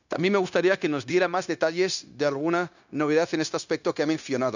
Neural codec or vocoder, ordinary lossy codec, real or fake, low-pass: codec, 16 kHz, 0.9 kbps, LongCat-Audio-Codec; none; fake; 7.2 kHz